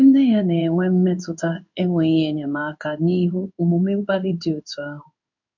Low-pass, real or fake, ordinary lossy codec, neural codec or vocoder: 7.2 kHz; fake; none; codec, 16 kHz in and 24 kHz out, 1 kbps, XY-Tokenizer